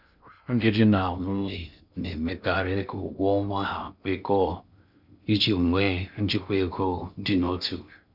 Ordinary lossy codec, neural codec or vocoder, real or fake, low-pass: none; codec, 16 kHz in and 24 kHz out, 0.6 kbps, FocalCodec, streaming, 2048 codes; fake; 5.4 kHz